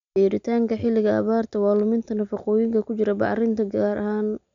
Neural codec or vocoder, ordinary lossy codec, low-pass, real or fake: none; MP3, 64 kbps; 7.2 kHz; real